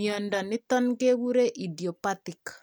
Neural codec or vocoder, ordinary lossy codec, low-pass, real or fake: none; none; none; real